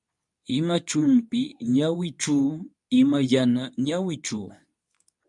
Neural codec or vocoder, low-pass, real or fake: codec, 24 kHz, 0.9 kbps, WavTokenizer, medium speech release version 2; 10.8 kHz; fake